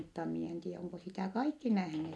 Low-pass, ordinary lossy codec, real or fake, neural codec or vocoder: none; none; real; none